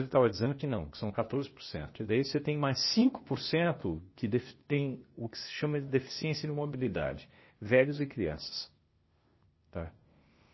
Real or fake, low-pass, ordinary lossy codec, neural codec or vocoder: fake; 7.2 kHz; MP3, 24 kbps; codec, 16 kHz, 0.8 kbps, ZipCodec